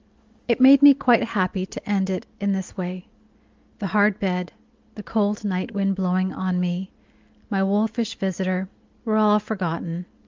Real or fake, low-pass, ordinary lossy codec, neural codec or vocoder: real; 7.2 kHz; Opus, 32 kbps; none